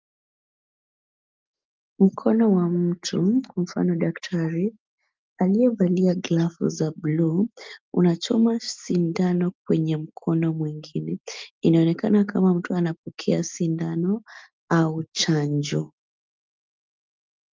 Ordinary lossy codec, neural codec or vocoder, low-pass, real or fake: Opus, 32 kbps; none; 7.2 kHz; real